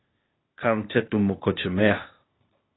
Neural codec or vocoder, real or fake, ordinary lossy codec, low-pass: codec, 16 kHz, 0.8 kbps, ZipCodec; fake; AAC, 16 kbps; 7.2 kHz